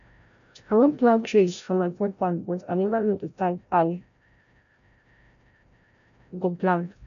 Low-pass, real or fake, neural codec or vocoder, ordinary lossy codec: 7.2 kHz; fake; codec, 16 kHz, 0.5 kbps, FreqCodec, larger model; none